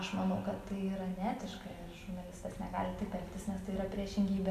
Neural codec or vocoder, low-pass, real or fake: none; 14.4 kHz; real